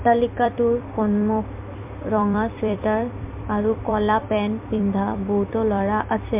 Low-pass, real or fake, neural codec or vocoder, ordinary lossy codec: 3.6 kHz; fake; vocoder, 44.1 kHz, 128 mel bands every 256 samples, BigVGAN v2; MP3, 32 kbps